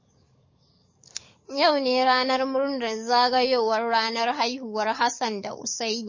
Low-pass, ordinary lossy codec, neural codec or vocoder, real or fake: 7.2 kHz; MP3, 32 kbps; codec, 44.1 kHz, 7.8 kbps, DAC; fake